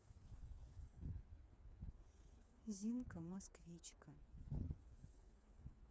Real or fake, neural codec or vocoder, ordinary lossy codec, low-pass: fake; codec, 16 kHz, 4 kbps, FreqCodec, smaller model; none; none